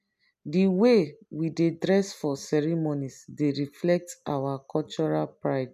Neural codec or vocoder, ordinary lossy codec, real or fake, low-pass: none; none; real; 14.4 kHz